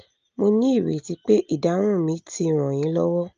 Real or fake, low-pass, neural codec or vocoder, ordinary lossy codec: real; 7.2 kHz; none; Opus, 24 kbps